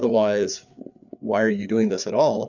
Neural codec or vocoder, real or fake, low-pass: codec, 16 kHz, 4 kbps, FreqCodec, larger model; fake; 7.2 kHz